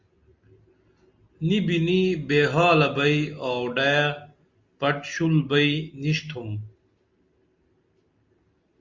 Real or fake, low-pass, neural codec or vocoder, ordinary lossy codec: real; 7.2 kHz; none; Opus, 64 kbps